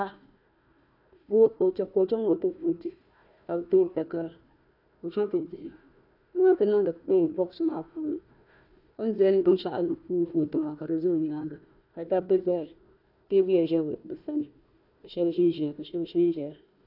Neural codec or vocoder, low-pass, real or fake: codec, 16 kHz, 1 kbps, FunCodec, trained on Chinese and English, 50 frames a second; 5.4 kHz; fake